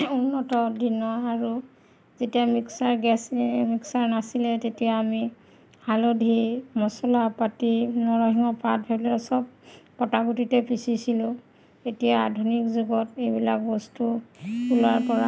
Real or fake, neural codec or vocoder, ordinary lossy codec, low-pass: real; none; none; none